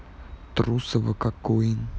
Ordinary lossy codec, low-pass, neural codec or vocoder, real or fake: none; none; none; real